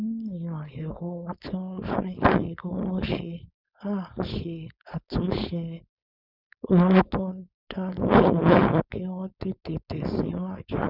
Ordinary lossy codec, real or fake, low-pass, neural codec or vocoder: none; fake; 5.4 kHz; codec, 16 kHz, 4.8 kbps, FACodec